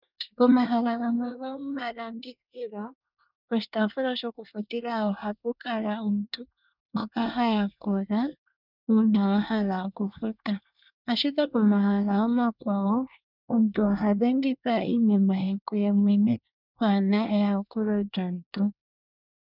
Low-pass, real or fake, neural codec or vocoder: 5.4 kHz; fake; codec, 24 kHz, 1 kbps, SNAC